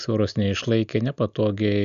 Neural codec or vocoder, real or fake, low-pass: none; real; 7.2 kHz